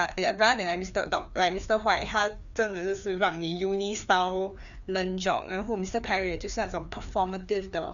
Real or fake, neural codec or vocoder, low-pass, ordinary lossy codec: fake; codec, 16 kHz, 2 kbps, FreqCodec, larger model; 7.2 kHz; none